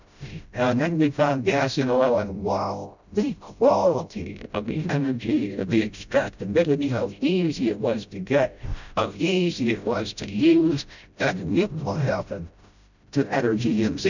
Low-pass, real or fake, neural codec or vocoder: 7.2 kHz; fake; codec, 16 kHz, 0.5 kbps, FreqCodec, smaller model